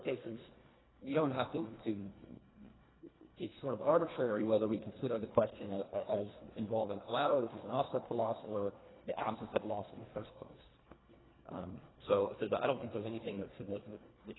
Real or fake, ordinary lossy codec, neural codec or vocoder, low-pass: fake; AAC, 16 kbps; codec, 24 kHz, 1.5 kbps, HILCodec; 7.2 kHz